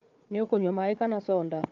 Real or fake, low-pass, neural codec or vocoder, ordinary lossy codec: fake; 7.2 kHz; codec, 16 kHz, 4 kbps, FunCodec, trained on Chinese and English, 50 frames a second; Opus, 32 kbps